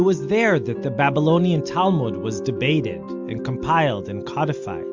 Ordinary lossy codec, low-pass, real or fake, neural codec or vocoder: MP3, 64 kbps; 7.2 kHz; real; none